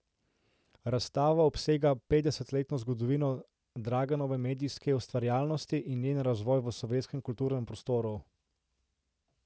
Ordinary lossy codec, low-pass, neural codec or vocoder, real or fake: none; none; none; real